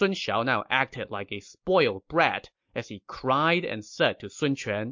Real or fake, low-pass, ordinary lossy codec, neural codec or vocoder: real; 7.2 kHz; MP3, 48 kbps; none